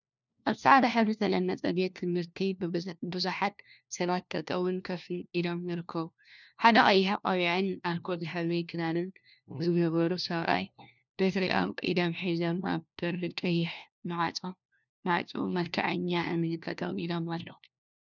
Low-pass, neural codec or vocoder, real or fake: 7.2 kHz; codec, 16 kHz, 1 kbps, FunCodec, trained on LibriTTS, 50 frames a second; fake